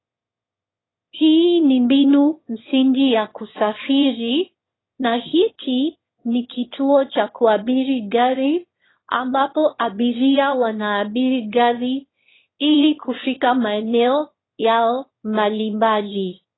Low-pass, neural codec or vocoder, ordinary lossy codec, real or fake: 7.2 kHz; autoencoder, 22.05 kHz, a latent of 192 numbers a frame, VITS, trained on one speaker; AAC, 16 kbps; fake